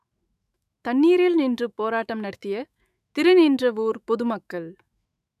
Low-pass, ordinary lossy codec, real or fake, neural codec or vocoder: 14.4 kHz; none; fake; autoencoder, 48 kHz, 128 numbers a frame, DAC-VAE, trained on Japanese speech